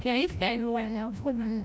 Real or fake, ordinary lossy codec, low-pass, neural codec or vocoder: fake; none; none; codec, 16 kHz, 0.5 kbps, FreqCodec, larger model